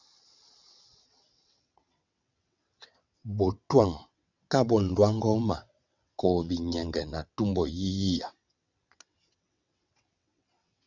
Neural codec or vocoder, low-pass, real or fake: vocoder, 22.05 kHz, 80 mel bands, WaveNeXt; 7.2 kHz; fake